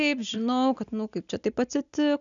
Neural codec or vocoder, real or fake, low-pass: none; real; 7.2 kHz